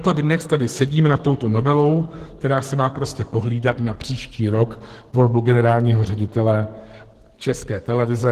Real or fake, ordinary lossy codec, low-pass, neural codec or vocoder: fake; Opus, 16 kbps; 14.4 kHz; codec, 44.1 kHz, 2.6 kbps, SNAC